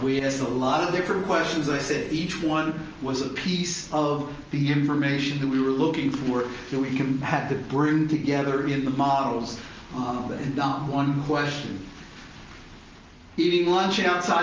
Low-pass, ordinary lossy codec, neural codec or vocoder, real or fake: 7.2 kHz; Opus, 24 kbps; none; real